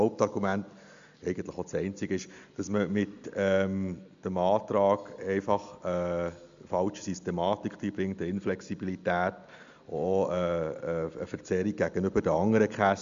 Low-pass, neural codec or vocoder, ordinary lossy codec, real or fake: 7.2 kHz; none; MP3, 64 kbps; real